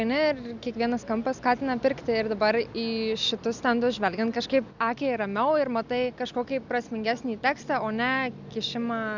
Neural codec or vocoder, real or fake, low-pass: none; real; 7.2 kHz